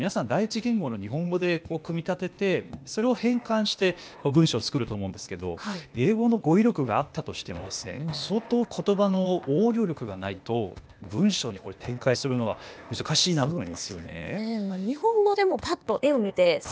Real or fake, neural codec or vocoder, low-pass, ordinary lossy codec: fake; codec, 16 kHz, 0.8 kbps, ZipCodec; none; none